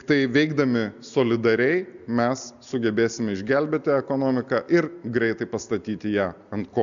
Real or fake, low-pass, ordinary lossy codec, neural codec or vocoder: real; 7.2 kHz; MP3, 96 kbps; none